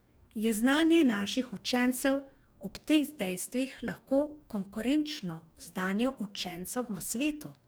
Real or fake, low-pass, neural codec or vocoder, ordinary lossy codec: fake; none; codec, 44.1 kHz, 2.6 kbps, DAC; none